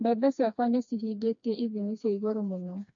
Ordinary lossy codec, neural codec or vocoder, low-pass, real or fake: AAC, 64 kbps; codec, 16 kHz, 2 kbps, FreqCodec, smaller model; 7.2 kHz; fake